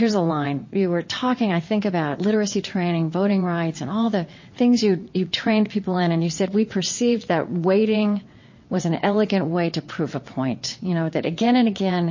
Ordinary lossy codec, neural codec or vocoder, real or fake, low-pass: MP3, 32 kbps; vocoder, 22.05 kHz, 80 mel bands, WaveNeXt; fake; 7.2 kHz